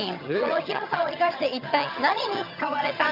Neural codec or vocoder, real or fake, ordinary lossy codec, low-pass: vocoder, 22.05 kHz, 80 mel bands, HiFi-GAN; fake; none; 5.4 kHz